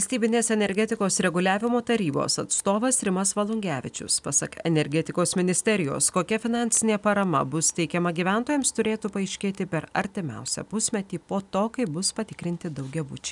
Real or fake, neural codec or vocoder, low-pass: real; none; 10.8 kHz